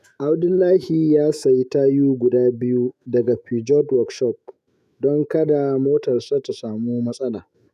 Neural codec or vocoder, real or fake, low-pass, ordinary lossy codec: autoencoder, 48 kHz, 128 numbers a frame, DAC-VAE, trained on Japanese speech; fake; 14.4 kHz; none